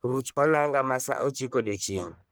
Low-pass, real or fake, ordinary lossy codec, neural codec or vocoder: none; fake; none; codec, 44.1 kHz, 1.7 kbps, Pupu-Codec